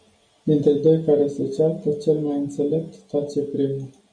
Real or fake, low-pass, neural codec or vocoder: real; 9.9 kHz; none